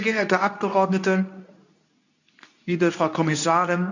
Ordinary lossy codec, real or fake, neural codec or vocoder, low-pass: none; fake; codec, 24 kHz, 0.9 kbps, WavTokenizer, medium speech release version 2; 7.2 kHz